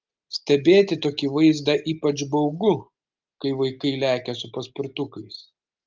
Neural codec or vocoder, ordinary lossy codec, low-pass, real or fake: none; Opus, 32 kbps; 7.2 kHz; real